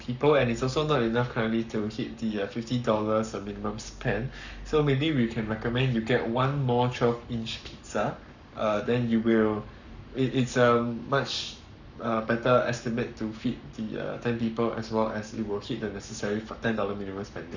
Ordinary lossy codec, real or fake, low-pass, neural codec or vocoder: none; fake; 7.2 kHz; codec, 44.1 kHz, 7.8 kbps, Pupu-Codec